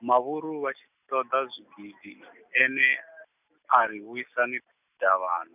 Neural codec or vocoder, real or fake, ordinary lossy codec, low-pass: none; real; none; 3.6 kHz